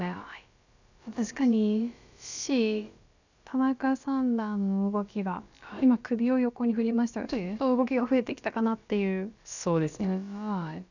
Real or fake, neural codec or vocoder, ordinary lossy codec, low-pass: fake; codec, 16 kHz, about 1 kbps, DyCAST, with the encoder's durations; none; 7.2 kHz